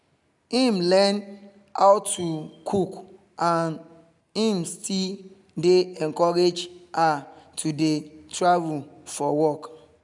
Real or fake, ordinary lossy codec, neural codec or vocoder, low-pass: real; none; none; 10.8 kHz